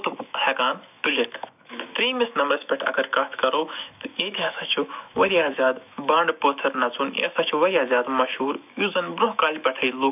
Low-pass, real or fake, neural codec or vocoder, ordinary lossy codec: 3.6 kHz; fake; autoencoder, 48 kHz, 128 numbers a frame, DAC-VAE, trained on Japanese speech; none